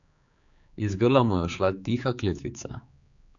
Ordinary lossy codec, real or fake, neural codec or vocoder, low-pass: none; fake; codec, 16 kHz, 4 kbps, X-Codec, HuBERT features, trained on general audio; 7.2 kHz